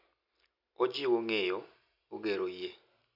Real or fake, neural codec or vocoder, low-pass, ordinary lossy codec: real; none; 5.4 kHz; none